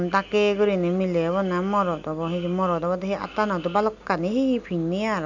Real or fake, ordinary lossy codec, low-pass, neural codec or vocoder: real; none; 7.2 kHz; none